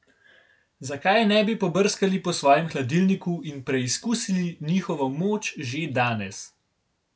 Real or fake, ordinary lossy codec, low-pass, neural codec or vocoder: real; none; none; none